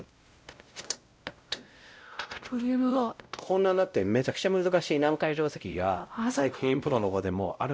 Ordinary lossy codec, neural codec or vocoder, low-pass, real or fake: none; codec, 16 kHz, 0.5 kbps, X-Codec, WavLM features, trained on Multilingual LibriSpeech; none; fake